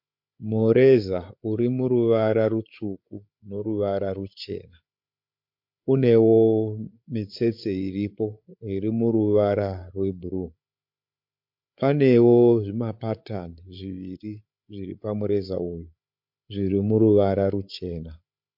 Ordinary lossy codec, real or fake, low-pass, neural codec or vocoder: MP3, 48 kbps; fake; 5.4 kHz; codec, 16 kHz, 8 kbps, FreqCodec, larger model